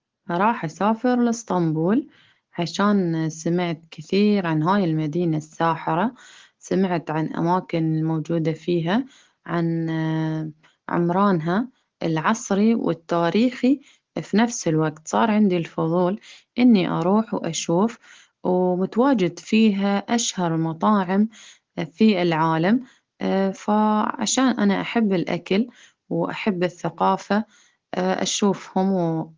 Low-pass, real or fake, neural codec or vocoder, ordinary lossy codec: 7.2 kHz; real; none; Opus, 16 kbps